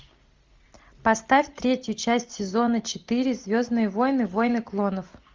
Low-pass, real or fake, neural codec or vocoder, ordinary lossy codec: 7.2 kHz; real; none; Opus, 32 kbps